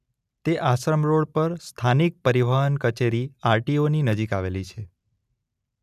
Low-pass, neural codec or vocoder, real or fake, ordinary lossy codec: 14.4 kHz; none; real; none